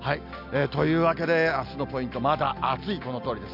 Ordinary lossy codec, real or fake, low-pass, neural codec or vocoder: AAC, 48 kbps; real; 5.4 kHz; none